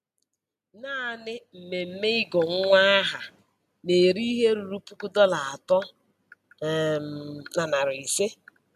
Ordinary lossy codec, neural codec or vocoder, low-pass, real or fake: MP3, 96 kbps; none; 14.4 kHz; real